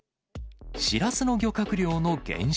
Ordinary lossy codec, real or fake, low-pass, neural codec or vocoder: none; real; none; none